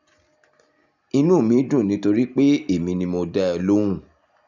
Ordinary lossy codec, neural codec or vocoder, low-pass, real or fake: none; none; 7.2 kHz; real